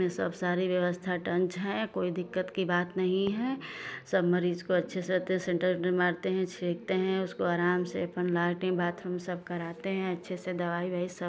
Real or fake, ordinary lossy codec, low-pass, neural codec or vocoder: real; none; none; none